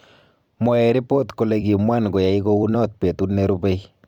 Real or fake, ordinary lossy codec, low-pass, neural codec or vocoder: fake; MP3, 96 kbps; 19.8 kHz; vocoder, 44.1 kHz, 128 mel bands every 256 samples, BigVGAN v2